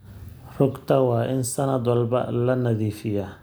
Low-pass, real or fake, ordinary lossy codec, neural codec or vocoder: none; real; none; none